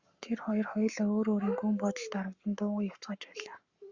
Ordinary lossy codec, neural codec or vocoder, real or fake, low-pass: Opus, 64 kbps; codec, 44.1 kHz, 7.8 kbps, Pupu-Codec; fake; 7.2 kHz